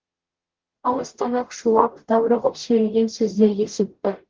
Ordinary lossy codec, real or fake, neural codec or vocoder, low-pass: Opus, 16 kbps; fake; codec, 44.1 kHz, 0.9 kbps, DAC; 7.2 kHz